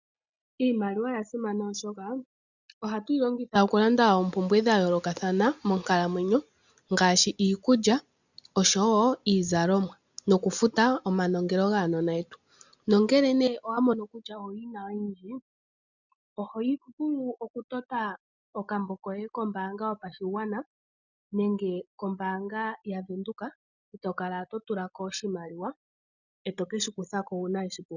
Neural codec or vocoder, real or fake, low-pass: none; real; 7.2 kHz